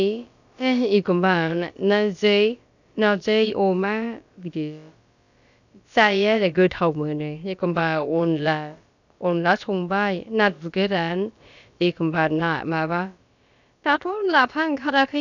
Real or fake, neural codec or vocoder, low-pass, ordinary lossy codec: fake; codec, 16 kHz, about 1 kbps, DyCAST, with the encoder's durations; 7.2 kHz; none